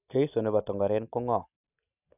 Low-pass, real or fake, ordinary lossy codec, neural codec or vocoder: 3.6 kHz; real; none; none